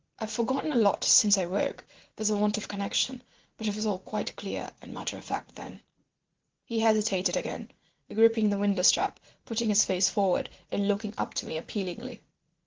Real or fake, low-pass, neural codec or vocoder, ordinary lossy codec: fake; 7.2 kHz; vocoder, 44.1 kHz, 80 mel bands, Vocos; Opus, 16 kbps